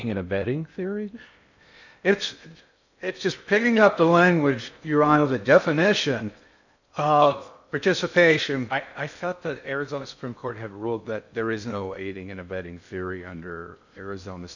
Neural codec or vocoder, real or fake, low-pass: codec, 16 kHz in and 24 kHz out, 0.6 kbps, FocalCodec, streaming, 2048 codes; fake; 7.2 kHz